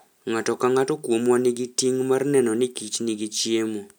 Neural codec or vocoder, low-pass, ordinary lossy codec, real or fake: none; none; none; real